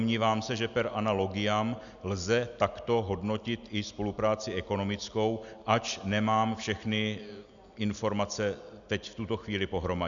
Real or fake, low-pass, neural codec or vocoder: real; 7.2 kHz; none